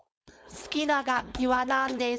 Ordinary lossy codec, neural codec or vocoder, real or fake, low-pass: none; codec, 16 kHz, 4.8 kbps, FACodec; fake; none